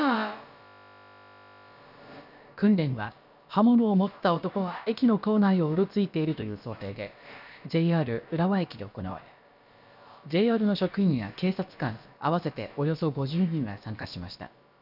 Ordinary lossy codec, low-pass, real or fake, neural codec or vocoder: none; 5.4 kHz; fake; codec, 16 kHz, about 1 kbps, DyCAST, with the encoder's durations